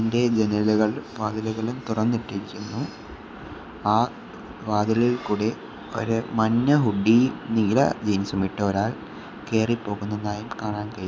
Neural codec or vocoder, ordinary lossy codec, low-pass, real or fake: none; none; none; real